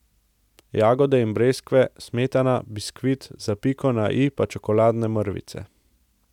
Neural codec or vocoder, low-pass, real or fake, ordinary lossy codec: none; 19.8 kHz; real; none